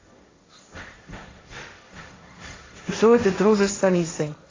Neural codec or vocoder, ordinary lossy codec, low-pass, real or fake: codec, 16 kHz, 1.1 kbps, Voila-Tokenizer; AAC, 32 kbps; 7.2 kHz; fake